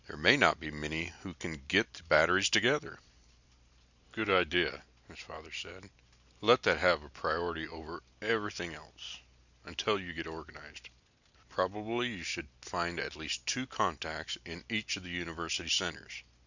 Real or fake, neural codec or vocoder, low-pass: real; none; 7.2 kHz